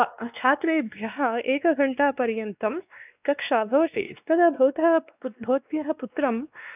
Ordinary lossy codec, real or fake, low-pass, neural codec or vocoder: none; fake; 3.6 kHz; codec, 16 kHz, 2 kbps, X-Codec, WavLM features, trained on Multilingual LibriSpeech